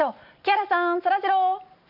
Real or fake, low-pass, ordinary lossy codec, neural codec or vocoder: real; 5.4 kHz; none; none